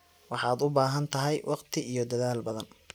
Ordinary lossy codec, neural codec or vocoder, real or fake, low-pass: none; none; real; none